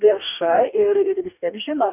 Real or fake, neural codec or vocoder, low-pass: fake; codec, 44.1 kHz, 2.6 kbps, DAC; 3.6 kHz